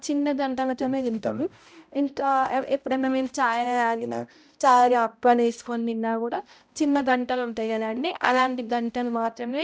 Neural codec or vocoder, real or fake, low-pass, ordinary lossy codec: codec, 16 kHz, 0.5 kbps, X-Codec, HuBERT features, trained on balanced general audio; fake; none; none